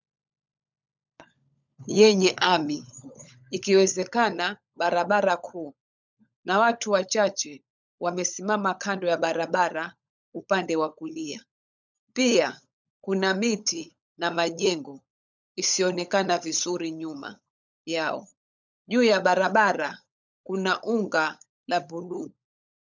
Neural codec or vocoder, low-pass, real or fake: codec, 16 kHz, 16 kbps, FunCodec, trained on LibriTTS, 50 frames a second; 7.2 kHz; fake